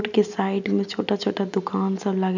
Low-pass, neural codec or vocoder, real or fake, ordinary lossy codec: 7.2 kHz; none; real; none